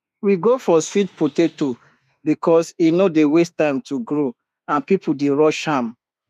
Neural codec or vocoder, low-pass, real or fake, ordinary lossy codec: autoencoder, 48 kHz, 32 numbers a frame, DAC-VAE, trained on Japanese speech; 14.4 kHz; fake; none